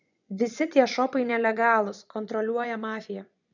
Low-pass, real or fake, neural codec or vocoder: 7.2 kHz; fake; vocoder, 24 kHz, 100 mel bands, Vocos